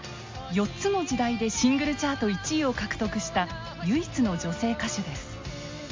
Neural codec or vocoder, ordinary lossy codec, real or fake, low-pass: none; MP3, 48 kbps; real; 7.2 kHz